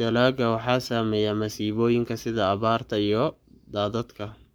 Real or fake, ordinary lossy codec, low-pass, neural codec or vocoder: fake; none; none; codec, 44.1 kHz, 7.8 kbps, Pupu-Codec